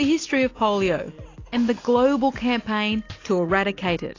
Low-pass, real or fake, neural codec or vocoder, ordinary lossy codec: 7.2 kHz; real; none; AAC, 32 kbps